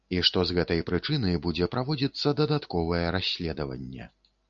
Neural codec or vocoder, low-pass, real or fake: none; 7.2 kHz; real